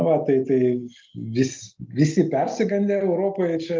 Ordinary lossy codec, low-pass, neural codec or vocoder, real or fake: Opus, 32 kbps; 7.2 kHz; none; real